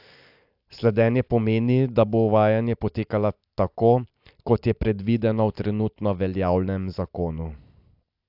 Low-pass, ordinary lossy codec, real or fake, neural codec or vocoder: 5.4 kHz; AAC, 48 kbps; real; none